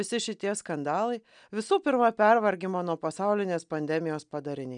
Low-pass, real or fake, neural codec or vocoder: 9.9 kHz; real; none